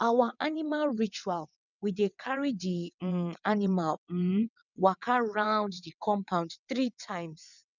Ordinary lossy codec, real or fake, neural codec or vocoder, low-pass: none; fake; vocoder, 22.05 kHz, 80 mel bands, WaveNeXt; 7.2 kHz